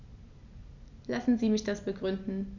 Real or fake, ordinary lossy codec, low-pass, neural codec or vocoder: real; none; 7.2 kHz; none